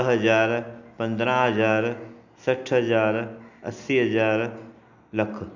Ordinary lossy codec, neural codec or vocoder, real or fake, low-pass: none; none; real; 7.2 kHz